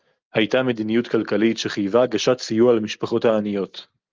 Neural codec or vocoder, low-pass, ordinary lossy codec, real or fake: none; 7.2 kHz; Opus, 32 kbps; real